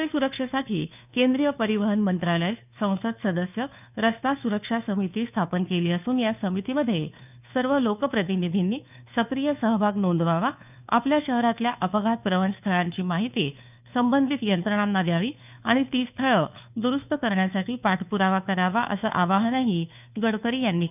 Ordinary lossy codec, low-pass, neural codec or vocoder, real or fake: none; 3.6 kHz; codec, 16 kHz, 2 kbps, FunCodec, trained on Chinese and English, 25 frames a second; fake